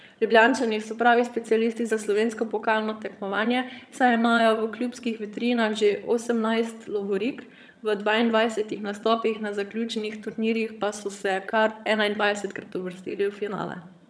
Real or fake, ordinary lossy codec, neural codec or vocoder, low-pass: fake; none; vocoder, 22.05 kHz, 80 mel bands, HiFi-GAN; none